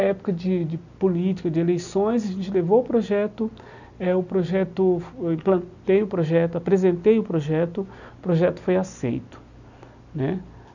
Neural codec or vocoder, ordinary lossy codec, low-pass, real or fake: none; none; 7.2 kHz; real